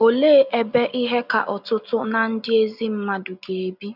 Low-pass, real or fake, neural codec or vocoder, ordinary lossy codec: 5.4 kHz; real; none; none